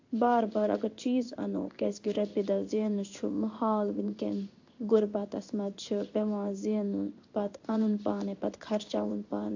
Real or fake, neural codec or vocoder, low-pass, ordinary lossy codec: fake; codec, 16 kHz in and 24 kHz out, 1 kbps, XY-Tokenizer; 7.2 kHz; AAC, 48 kbps